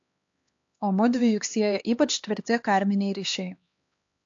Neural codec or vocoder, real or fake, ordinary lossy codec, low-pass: codec, 16 kHz, 2 kbps, X-Codec, HuBERT features, trained on LibriSpeech; fake; AAC, 48 kbps; 7.2 kHz